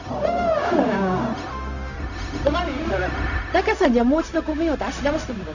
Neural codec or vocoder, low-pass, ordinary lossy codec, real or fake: codec, 16 kHz, 0.4 kbps, LongCat-Audio-Codec; 7.2 kHz; none; fake